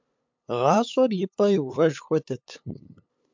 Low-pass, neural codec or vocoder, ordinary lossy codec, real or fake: 7.2 kHz; codec, 16 kHz, 8 kbps, FunCodec, trained on LibriTTS, 25 frames a second; MP3, 64 kbps; fake